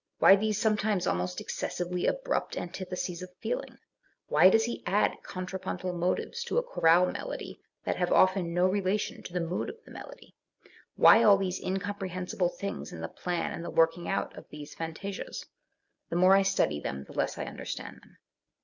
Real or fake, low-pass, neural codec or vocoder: real; 7.2 kHz; none